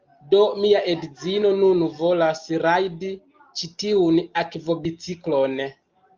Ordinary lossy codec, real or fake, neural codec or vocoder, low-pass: Opus, 24 kbps; real; none; 7.2 kHz